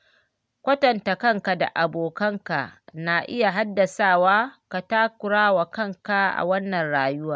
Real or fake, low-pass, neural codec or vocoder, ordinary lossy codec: real; none; none; none